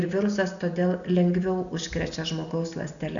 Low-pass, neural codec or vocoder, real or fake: 7.2 kHz; none; real